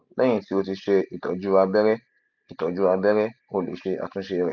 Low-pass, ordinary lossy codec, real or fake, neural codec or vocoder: 7.2 kHz; none; real; none